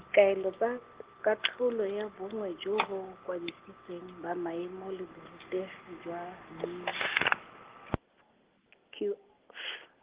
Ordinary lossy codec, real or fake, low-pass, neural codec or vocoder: Opus, 16 kbps; real; 3.6 kHz; none